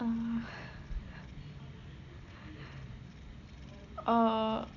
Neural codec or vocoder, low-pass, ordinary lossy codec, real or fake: none; 7.2 kHz; none; real